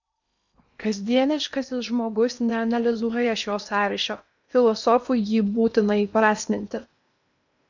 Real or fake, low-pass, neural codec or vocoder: fake; 7.2 kHz; codec, 16 kHz in and 24 kHz out, 0.8 kbps, FocalCodec, streaming, 65536 codes